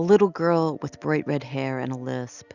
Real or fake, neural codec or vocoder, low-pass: real; none; 7.2 kHz